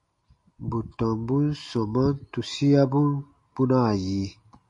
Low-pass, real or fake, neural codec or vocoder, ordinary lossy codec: 10.8 kHz; real; none; MP3, 48 kbps